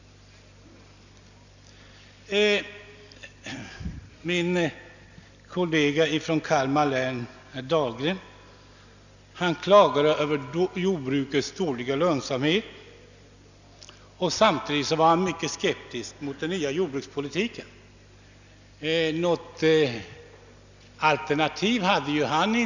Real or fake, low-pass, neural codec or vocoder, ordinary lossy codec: real; 7.2 kHz; none; none